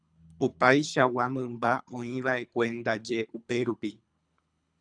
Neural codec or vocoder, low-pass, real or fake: codec, 24 kHz, 3 kbps, HILCodec; 9.9 kHz; fake